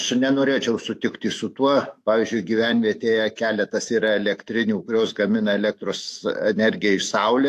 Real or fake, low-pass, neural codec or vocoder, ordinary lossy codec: fake; 14.4 kHz; autoencoder, 48 kHz, 128 numbers a frame, DAC-VAE, trained on Japanese speech; AAC, 64 kbps